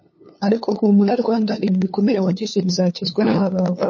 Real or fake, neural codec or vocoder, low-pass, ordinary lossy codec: fake; codec, 16 kHz, 2 kbps, FunCodec, trained on LibriTTS, 25 frames a second; 7.2 kHz; MP3, 32 kbps